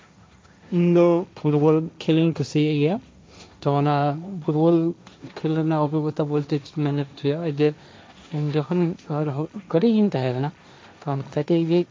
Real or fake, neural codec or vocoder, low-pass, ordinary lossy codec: fake; codec, 16 kHz, 1.1 kbps, Voila-Tokenizer; none; none